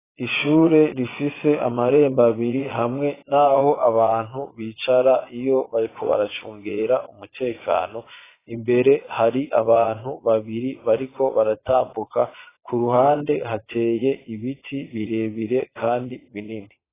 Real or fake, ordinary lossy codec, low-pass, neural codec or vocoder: fake; AAC, 16 kbps; 3.6 kHz; vocoder, 22.05 kHz, 80 mel bands, Vocos